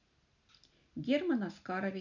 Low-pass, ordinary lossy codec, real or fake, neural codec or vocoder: 7.2 kHz; none; real; none